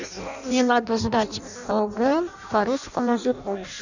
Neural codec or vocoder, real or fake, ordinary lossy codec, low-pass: codec, 16 kHz in and 24 kHz out, 0.6 kbps, FireRedTTS-2 codec; fake; none; 7.2 kHz